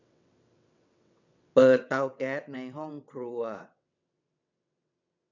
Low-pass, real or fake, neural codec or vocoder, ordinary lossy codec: 7.2 kHz; fake; vocoder, 22.05 kHz, 80 mel bands, WaveNeXt; none